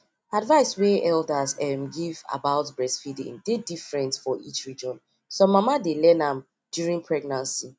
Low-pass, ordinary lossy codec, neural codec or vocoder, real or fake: none; none; none; real